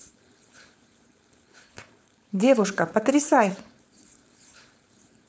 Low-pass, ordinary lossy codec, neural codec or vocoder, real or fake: none; none; codec, 16 kHz, 4.8 kbps, FACodec; fake